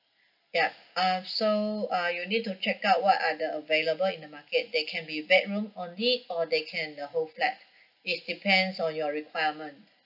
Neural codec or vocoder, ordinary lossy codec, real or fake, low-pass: none; none; real; 5.4 kHz